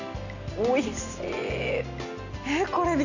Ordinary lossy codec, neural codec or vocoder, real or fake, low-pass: none; none; real; 7.2 kHz